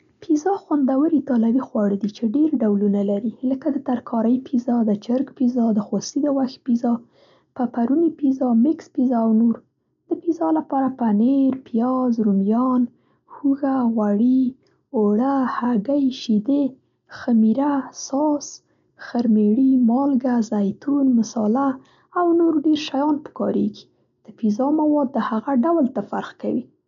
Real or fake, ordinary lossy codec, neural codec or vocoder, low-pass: real; MP3, 96 kbps; none; 7.2 kHz